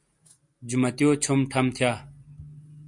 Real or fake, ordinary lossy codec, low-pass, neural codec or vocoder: real; MP3, 64 kbps; 10.8 kHz; none